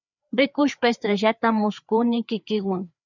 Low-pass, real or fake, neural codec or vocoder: 7.2 kHz; fake; codec, 16 kHz, 4 kbps, FreqCodec, larger model